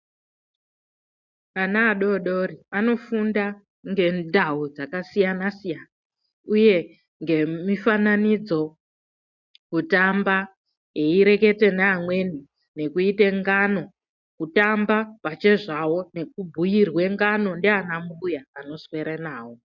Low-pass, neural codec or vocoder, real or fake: 7.2 kHz; none; real